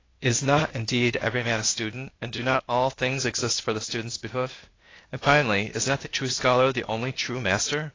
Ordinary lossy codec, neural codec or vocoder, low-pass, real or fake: AAC, 32 kbps; codec, 16 kHz, 0.8 kbps, ZipCodec; 7.2 kHz; fake